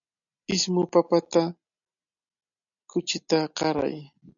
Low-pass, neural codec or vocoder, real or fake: 7.2 kHz; none; real